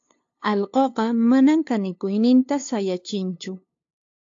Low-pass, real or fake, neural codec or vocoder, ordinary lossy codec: 7.2 kHz; fake; codec, 16 kHz, 2 kbps, FunCodec, trained on LibriTTS, 25 frames a second; AAC, 48 kbps